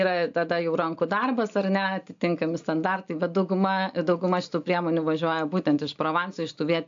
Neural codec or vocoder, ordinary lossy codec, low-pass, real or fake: none; AAC, 64 kbps; 7.2 kHz; real